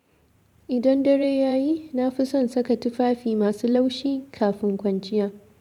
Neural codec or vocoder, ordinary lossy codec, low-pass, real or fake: vocoder, 44.1 kHz, 128 mel bands every 512 samples, BigVGAN v2; MP3, 96 kbps; 19.8 kHz; fake